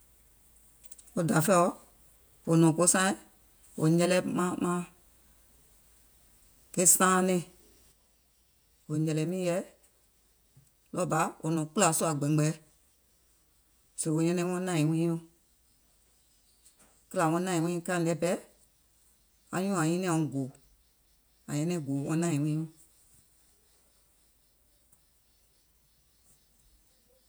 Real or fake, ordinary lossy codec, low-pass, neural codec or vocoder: fake; none; none; vocoder, 48 kHz, 128 mel bands, Vocos